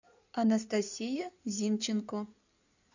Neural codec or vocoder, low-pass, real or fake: codec, 16 kHz in and 24 kHz out, 2.2 kbps, FireRedTTS-2 codec; 7.2 kHz; fake